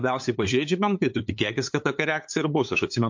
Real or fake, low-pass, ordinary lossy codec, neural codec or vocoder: fake; 7.2 kHz; MP3, 48 kbps; codec, 16 kHz, 8 kbps, FunCodec, trained on LibriTTS, 25 frames a second